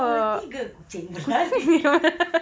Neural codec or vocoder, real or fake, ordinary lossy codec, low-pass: none; real; none; none